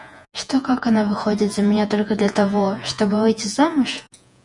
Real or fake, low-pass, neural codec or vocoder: fake; 10.8 kHz; vocoder, 48 kHz, 128 mel bands, Vocos